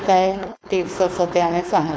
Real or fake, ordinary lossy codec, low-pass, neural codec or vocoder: fake; none; none; codec, 16 kHz, 4.8 kbps, FACodec